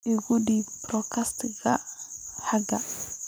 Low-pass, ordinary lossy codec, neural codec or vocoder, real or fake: none; none; none; real